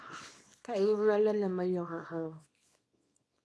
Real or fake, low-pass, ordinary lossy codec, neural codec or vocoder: fake; none; none; codec, 24 kHz, 0.9 kbps, WavTokenizer, small release